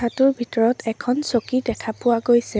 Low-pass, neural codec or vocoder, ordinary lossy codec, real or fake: none; none; none; real